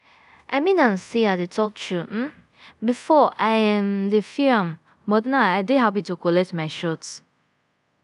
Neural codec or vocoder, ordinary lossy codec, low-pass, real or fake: codec, 24 kHz, 0.5 kbps, DualCodec; none; 10.8 kHz; fake